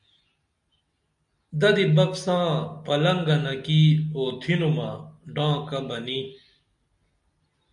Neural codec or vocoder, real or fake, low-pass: none; real; 10.8 kHz